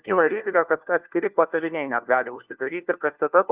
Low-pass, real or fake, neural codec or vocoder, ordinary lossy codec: 3.6 kHz; fake; codec, 16 kHz, 1 kbps, FunCodec, trained on LibriTTS, 50 frames a second; Opus, 32 kbps